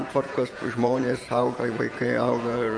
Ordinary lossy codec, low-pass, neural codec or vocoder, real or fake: MP3, 48 kbps; 9.9 kHz; vocoder, 48 kHz, 128 mel bands, Vocos; fake